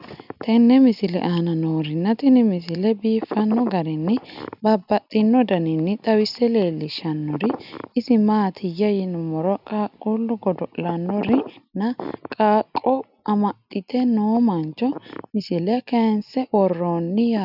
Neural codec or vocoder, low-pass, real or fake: none; 5.4 kHz; real